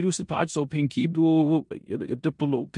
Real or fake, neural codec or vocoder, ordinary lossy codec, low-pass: fake; codec, 16 kHz in and 24 kHz out, 0.9 kbps, LongCat-Audio-Codec, four codebook decoder; MP3, 64 kbps; 10.8 kHz